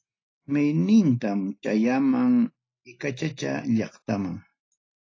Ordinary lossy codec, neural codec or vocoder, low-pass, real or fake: AAC, 32 kbps; none; 7.2 kHz; real